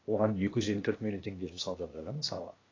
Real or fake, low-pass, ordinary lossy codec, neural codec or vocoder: fake; 7.2 kHz; AAC, 32 kbps; codec, 16 kHz, 0.8 kbps, ZipCodec